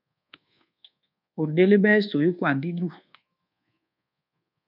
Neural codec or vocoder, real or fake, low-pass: codec, 24 kHz, 1.2 kbps, DualCodec; fake; 5.4 kHz